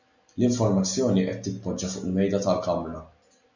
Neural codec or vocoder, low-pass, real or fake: none; 7.2 kHz; real